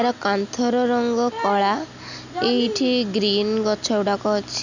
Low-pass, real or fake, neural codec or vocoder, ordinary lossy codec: 7.2 kHz; real; none; none